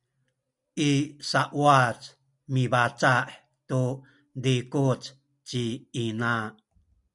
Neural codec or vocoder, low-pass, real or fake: none; 10.8 kHz; real